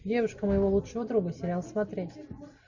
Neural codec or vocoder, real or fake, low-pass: none; real; 7.2 kHz